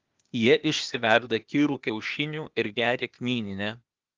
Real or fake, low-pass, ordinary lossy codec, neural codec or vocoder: fake; 7.2 kHz; Opus, 24 kbps; codec, 16 kHz, 0.8 kbps, ZipCodec